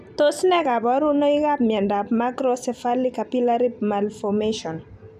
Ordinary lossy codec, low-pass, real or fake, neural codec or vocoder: none; 14.4 kHz; fake; vocoder, 44.1 kHz, 128 mel bands every 256 samples, BigVGAN v2